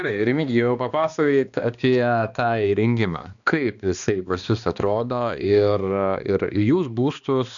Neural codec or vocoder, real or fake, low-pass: codec, 16 kHz, 2 kbps, X-Codec, HuBERT features, trained on balanced general audio; fake; 7.2 kHz